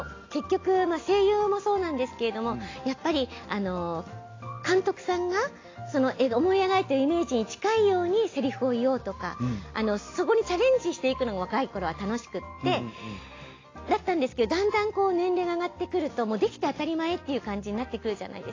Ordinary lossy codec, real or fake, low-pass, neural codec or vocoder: AAC, 32 kbps; real; 7.2 kHz; none